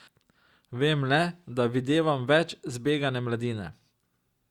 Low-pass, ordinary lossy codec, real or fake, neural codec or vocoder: 19.8 kHz; Opus, 64 kbps; real; none